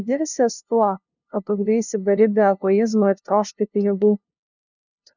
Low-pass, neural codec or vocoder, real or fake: 7.2 kHz; codec, 16 kHz, 0.5 kbps, FunCodec, trained on LibriTTS, 25 frames a second; fake